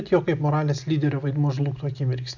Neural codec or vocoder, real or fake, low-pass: none; real; 7.2 kHz